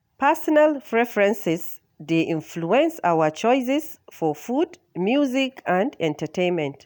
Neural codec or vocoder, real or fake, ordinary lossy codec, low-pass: none; real; none; none